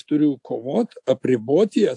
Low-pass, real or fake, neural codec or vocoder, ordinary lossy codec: 10.8 kHz; real; none; AAC, 64 kbps